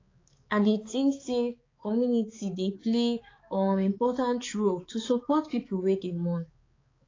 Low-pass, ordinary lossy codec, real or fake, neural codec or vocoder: 7.2 kHz; AAC, 32 kbps; fake; codec, 16 kHz, 4 kbps, X-Codec, HuBERT features, trained on balanced general audio